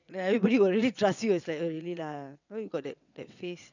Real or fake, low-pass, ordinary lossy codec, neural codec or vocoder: fake; 7.2 kHz; none; vocoder, 22.05 kHz, 80 mel bands, WaveNeXt